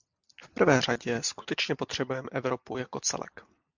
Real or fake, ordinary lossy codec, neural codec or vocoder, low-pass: real; MP3, 64 kbps; none; 7.2 kHz